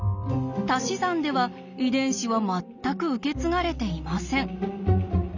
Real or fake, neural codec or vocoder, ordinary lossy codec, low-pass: real; none; none; 7.2 kHz